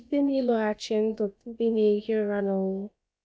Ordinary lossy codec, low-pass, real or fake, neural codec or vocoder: none; none; fake; codec, 16 kHz, about 1 kbps, DyCAST, with the encoder's durations